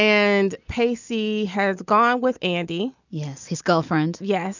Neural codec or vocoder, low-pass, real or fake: none; 7.2 kHz; real